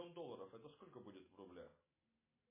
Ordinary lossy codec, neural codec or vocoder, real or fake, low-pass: MP3, 16 kbps; none; real; 3.6 kHz